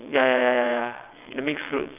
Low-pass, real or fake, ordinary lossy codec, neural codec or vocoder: 3.6 kHz; fake; none; vocoder, 22.05 kHz, 80 mel bands, WaveNeXt